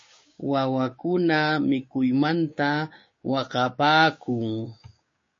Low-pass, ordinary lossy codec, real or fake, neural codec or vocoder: 7.2 kHz; MP3, 32 kbps; fake; codec, 16 kHz, 6 kbps, DAC